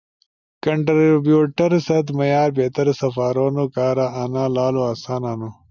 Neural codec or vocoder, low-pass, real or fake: none; 7.2 kHz; real